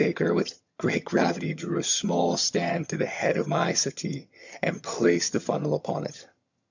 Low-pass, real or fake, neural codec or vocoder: 7.2 kHz; fake; vocoder, 22.05 kHz, 80 mel bands, HiFi-GAN